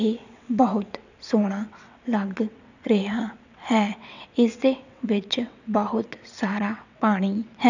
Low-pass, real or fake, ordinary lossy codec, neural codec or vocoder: 7.2 kHz; fake; none; vocoder, 22.05 kHz, 80 mel bands, WaveNeXt